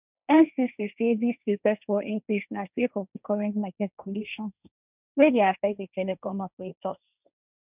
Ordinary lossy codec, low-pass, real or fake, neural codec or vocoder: none; 3.6 kHz; fake; codec, 16 kHz, 1.1 kbps, Voila-Tokenizer